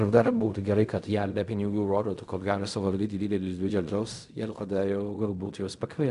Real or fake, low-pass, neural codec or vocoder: fake; 10.8 kHz; codec, 16 kHz in and 24 kHz out, 0.4 kbps, LongCat-Audio-Codec, fine tuned four codebook decoder